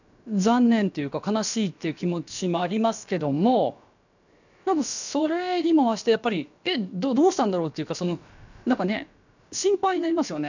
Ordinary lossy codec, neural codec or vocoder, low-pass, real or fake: none; codec, 16 kHz, about 1 kbps, DyCAST, with the encoder's durations; 7.2 kHz; fake